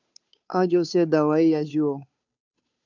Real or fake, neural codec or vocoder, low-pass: fake; codec, 16 kHz, 2 kbps, FunCodec, trained on Chinese and English, 25 frames a second; 7.2 kHz